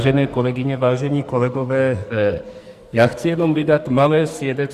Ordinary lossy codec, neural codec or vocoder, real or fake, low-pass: Opus, 64 kbps; codec, 44.1 kHz, 2.6 kbps, SNAC; fake; 14.4 kHz